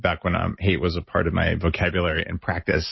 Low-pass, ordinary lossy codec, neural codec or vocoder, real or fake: 7.2 kHz; MP3, 24 kbps; none; real